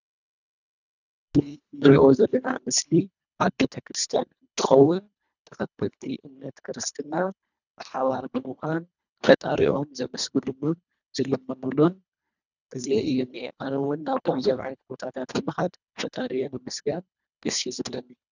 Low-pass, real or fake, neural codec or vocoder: 7.2 kHz; fake; codec, 24 kHz, 1.5 kbps, HILCodec